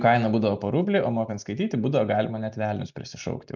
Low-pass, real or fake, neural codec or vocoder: 7.2 kHz; real; none